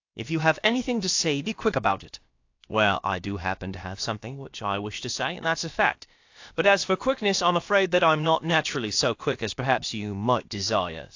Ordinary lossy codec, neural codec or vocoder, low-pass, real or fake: AAC, 48 kbps; codec, 16 kHz, about 1 kbps, DyCAST, with the encoder's durations; 7.2 kHz; fake